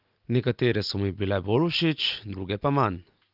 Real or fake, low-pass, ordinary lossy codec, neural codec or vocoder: real; 5.4 kHz; Opus, 24 kbps; none